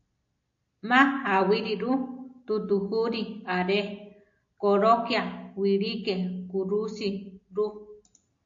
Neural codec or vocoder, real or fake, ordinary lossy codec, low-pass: none; real; AAC, 48 kbps; 7.2 kHz